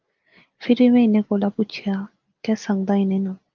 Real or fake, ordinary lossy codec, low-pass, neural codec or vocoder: real; Opus, 24 kbps; 7.2 kHz; none